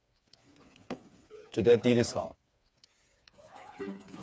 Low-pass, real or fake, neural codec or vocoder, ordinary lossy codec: none; fake; codec, 16 kHz, 4 kbps, FreqCodec, smaller model; none